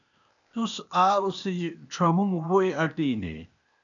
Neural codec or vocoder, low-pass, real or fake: codec, 16 kHz, 0.8 kbps, ZipCodec; 7.2 kHz; fake